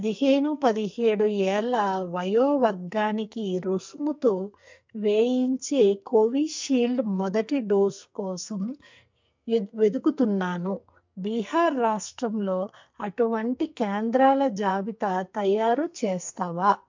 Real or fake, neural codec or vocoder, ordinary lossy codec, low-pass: fake; codec, 44.1 kHz, 2.6 kbps, SNAC; MP3, 64 kbps; 7.2 kHz